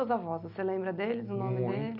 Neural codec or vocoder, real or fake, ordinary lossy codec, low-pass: none; real; none; 5.4 kHz